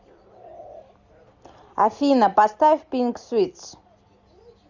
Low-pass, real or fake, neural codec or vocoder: 7.2 kHz; real; none